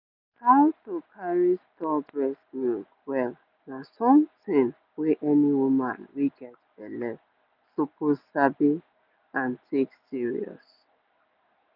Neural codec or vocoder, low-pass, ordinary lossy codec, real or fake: none; 5.4 kHz; none; real